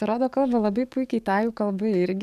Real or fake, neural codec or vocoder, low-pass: fake; codec, 44.1 kHz, 7.8 kbps, DAC; 14.4 kHz